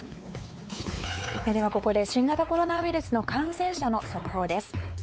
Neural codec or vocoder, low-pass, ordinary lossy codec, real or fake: codec, 16 kHz, 4 kbps, X-Codec, WavLM features, trained on Multilingual LibriSpeech; none; none; fake